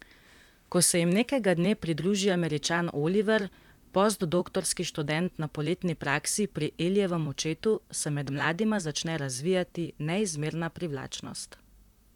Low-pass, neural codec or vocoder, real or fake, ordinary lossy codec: 19.8 kHz; vocoder, 44.1 kHz, 128 mel bands, Pupu-Vocoder; fake; none